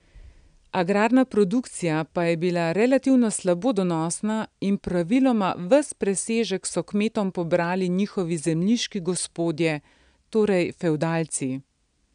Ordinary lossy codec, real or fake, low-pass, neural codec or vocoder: none; real; 9.9 kHz; none